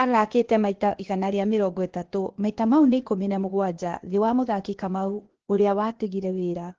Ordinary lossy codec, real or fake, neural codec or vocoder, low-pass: Opus, 16 kbps; fake; codec, 16 kHz, 0.8 kbps, ZipCodec; 7.2 kHz